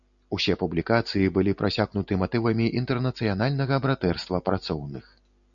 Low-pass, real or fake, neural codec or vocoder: 7.2 kHz; real; none